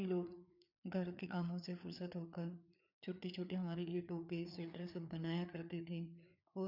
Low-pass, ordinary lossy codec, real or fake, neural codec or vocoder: 5.4 kHz; none; fake; codec, 16 kHz, 4 kbps, FreqCodec, larger model